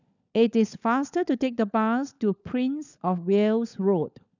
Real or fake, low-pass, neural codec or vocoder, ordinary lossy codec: fake; 7.2 kHz; codec, 16 kHz, 16 kbps, FunCodec, trained on LibriTTS, 50 frames a second; none